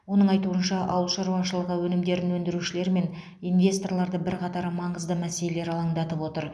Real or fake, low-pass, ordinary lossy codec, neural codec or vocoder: real; none; none; none